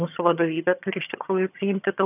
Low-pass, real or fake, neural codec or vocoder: 3.6 kHz; fake; vocoder, 22.05 kHz, 80 mel bands, HiFi-GAN